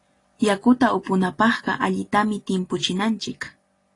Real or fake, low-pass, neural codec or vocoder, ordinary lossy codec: real; 10.8 kHz; none; AAC, 32 kbps